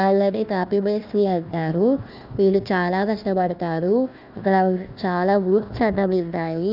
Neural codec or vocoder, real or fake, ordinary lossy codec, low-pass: codec, 16 kHz, 1 kbps, FunCodec, trained on Chinese and English, 50 frames a second; fake; none; 5.4 kHz